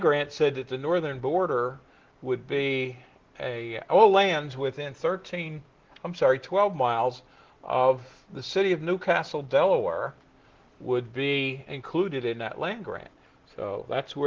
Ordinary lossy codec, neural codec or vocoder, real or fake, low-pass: Opus, 16 kbps; none; real; 7.2 kHz